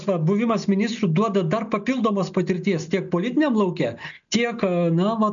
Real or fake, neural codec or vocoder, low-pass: real; none; 7.2 kHz